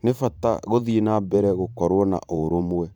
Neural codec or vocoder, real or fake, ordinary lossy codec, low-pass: vocoder, 44.1 kHz, 128 mel bands every 512 samples, BigVGAN v2; fake; none; 19.8 kHz